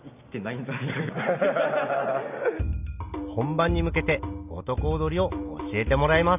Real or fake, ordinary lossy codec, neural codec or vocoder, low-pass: real; none; none; 3.6 kHz